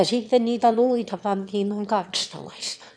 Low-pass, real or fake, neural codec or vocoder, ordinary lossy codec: none; fake; autoencoder, 22.05 kHz, a latent of 192 numbers a frame, VITS, trained on one speaker; none